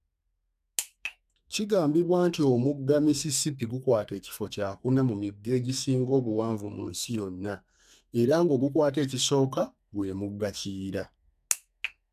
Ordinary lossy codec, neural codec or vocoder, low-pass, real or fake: none; codec, 32 kHz, 1.9 kbps, SNAC; 14.4 kHz; fake